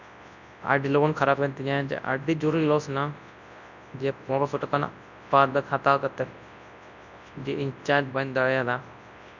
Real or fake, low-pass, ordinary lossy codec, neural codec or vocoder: fake; 7.2 kHz; none; codec, 24 kHz, 0.9 kbps, WavTokenizer, large speech release